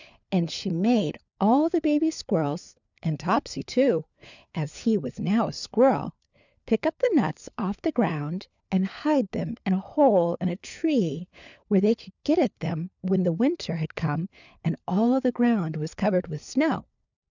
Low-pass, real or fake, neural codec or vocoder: 7.2 kHz; fake; codec, 16 kHz, 4 kbps, FunCodec, trained on LibriTTS, 50 frames a second